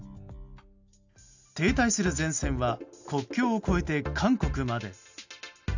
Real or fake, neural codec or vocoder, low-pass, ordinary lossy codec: real; none; 7.2 kHz; none